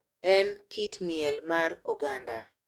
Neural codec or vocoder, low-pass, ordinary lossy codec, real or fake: codec, 44.1 kHz, 2.6 kbps, DAC; 19.8 kHz; none; fake